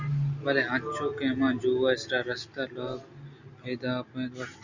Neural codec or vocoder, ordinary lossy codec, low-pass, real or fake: none; Opus, 64 kbps; 7.2 kHz; real